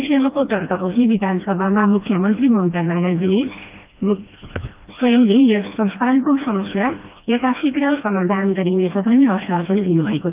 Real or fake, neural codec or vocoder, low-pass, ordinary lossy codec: fake; codec, 16 kHz, 1 kbps, FreqCodec, smaller model; 3.6 kHz; Opus, 24 kbps